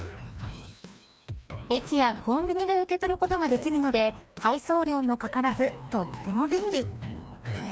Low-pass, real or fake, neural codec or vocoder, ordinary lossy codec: none; fake; codec, 16 kHz, 1 kbps, FreqCodec, larger model; none